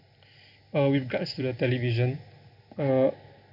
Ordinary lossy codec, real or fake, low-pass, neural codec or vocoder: none; real; 5.4 kHz; none